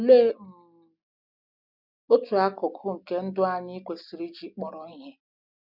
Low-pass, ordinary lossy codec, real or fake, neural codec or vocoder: 5.4 kHz; none; real; none